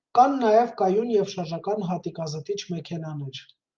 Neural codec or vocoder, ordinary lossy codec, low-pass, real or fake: none; Opus, 24 kbps; 7.2 kHz; real